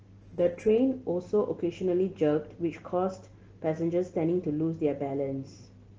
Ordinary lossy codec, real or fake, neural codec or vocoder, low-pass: Opus, 16 kbps; real; none; 7.2 kHz